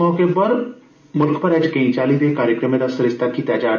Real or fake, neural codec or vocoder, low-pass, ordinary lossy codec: real; none; 7.2 kHz; none